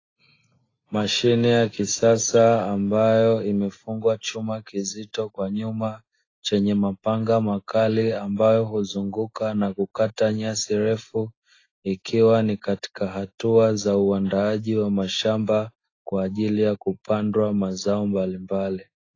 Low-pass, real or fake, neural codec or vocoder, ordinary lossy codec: 7.2 kHz; real; none; AAC, 32 kbps